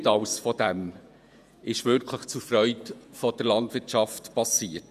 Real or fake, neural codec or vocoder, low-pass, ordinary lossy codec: fake; vocoder, 48 kHz, 128 mel bands, Vocos; 14.4 kHz; none